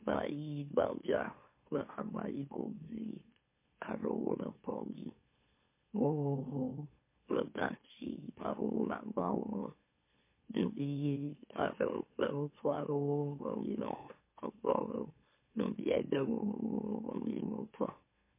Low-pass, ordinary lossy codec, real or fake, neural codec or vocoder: 3.6 kHz; MP3, 24 kbps; fake; autoencoder, 44.1 kHz, a latent of 192 numbers a frame, MeloTTS